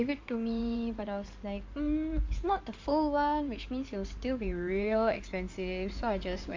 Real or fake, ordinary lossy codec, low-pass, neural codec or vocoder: fake; MP3, 48 kbps; 7.2 kHz; codec, 16 kHz in and 24 kHz out, 2.2 kbps, FireRedTTS-2 codec